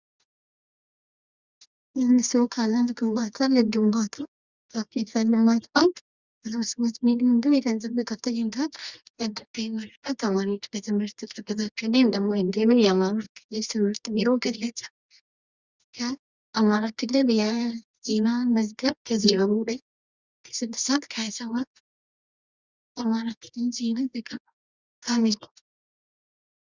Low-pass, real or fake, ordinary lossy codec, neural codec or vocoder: 7.2 kHz; fake; Opus, 64 kbps; codec, 24 kHz, 0.9 kbps, WavTokenizer, medium music audio release